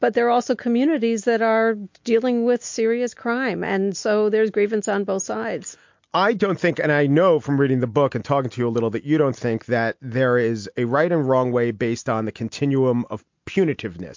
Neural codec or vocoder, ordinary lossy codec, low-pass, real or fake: none; MP3, 48 kbps; 7.2 kHz; real